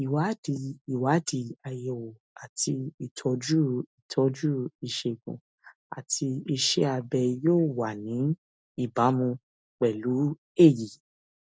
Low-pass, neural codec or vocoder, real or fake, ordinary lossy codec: none; none; real; none